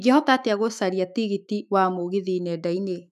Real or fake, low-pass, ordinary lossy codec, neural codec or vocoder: fake; 14.4 kHz; none; autoencoder, 48 kHz, 128 numbers a frame, DAC-VAE, trained on Japanese speech